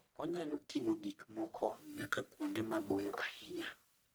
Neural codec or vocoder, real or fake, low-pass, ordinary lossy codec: codec, 44.1 kHz, 1.7 kbps, Pupu-Codec; fake; none; none